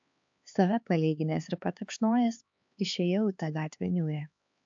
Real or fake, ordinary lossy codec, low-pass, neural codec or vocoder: fake; AAC, 64 kbps; 7.2 kHz; codec, 16 kHz, 4 kbps, X-Codec, HuBERT features, trained on LibriSpeech